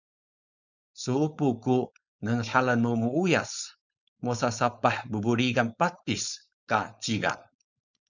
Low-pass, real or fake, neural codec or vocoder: 7.2 kHz; fake; codec, 16 kHz, 4.8 kbps, FACodec